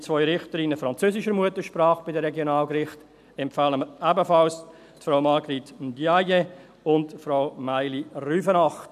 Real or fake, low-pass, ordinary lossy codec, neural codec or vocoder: real; 14.4 kHz; none; none